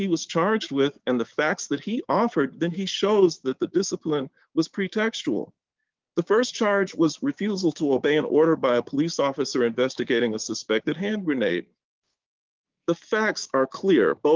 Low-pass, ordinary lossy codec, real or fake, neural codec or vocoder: 7.2 kHz; Opus, 24 kbps; fake; codec, 16 kHz, 6 kbps, DAC